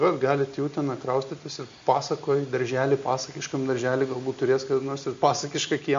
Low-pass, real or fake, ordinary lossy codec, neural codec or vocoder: 7.2 kHz; real; MP3, 48 kbps; none